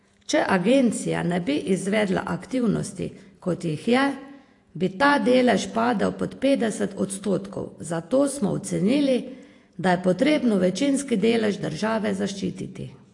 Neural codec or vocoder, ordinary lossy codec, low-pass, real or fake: vocoder, 48 kHz, 128 mel bands, Vocos; AAC, 48 kbps; 10.8 kHz; fake